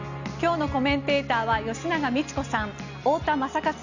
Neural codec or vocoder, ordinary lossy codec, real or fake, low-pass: none; none; real; 7.2 kHz